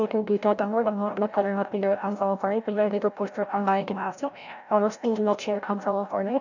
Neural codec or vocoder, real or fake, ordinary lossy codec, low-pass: codec, 16 kHz, 0.5 kbps, FreqCodec, larger model; fake; none; 7.2 kHz